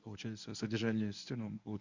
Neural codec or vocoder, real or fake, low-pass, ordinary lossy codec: codec, 24 kHz, 0.9 kbps, WavTokenizer, medium speech release version 1; fake; 7.2 kHz; Opus, 64 kbps